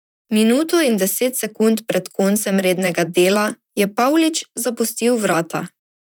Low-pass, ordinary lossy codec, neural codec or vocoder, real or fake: none; none; vocoder, 44.1 kHz, 128 mel bands, Pupu-Vocoder; fake